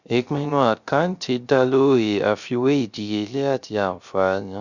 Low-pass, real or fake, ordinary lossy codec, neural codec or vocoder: 7.2 kHz; fake; Opus, 64 kbps; codec, 16 kHz, 0.3 kbps, FocalCodec